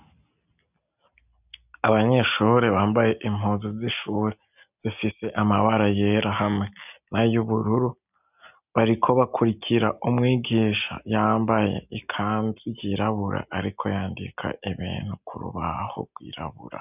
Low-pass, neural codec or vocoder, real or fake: 3.6 kHz; none; real